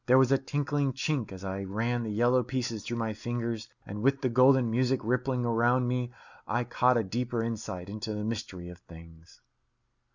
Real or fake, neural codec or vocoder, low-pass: real; none; 7.2 kHz